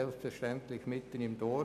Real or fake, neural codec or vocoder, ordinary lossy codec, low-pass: real; none; none; 14.4 kHz